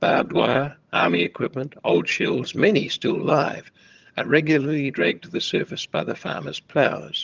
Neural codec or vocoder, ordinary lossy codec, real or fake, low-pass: vocoder, 22.05 kHz, 80 mel bands, HiFi-GAN; Opus, 24 kbps; fake; 7.2 kHz